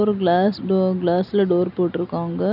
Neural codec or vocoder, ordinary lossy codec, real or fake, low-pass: none; none; real; 5.4 kHz